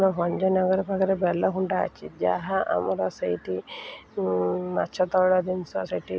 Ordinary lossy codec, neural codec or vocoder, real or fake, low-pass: none; none; real; none